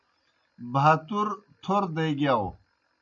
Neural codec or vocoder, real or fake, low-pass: none; real; 7.2 kHz